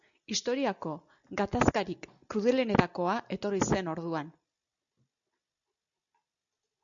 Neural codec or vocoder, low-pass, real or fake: none; 7.2 kHz; real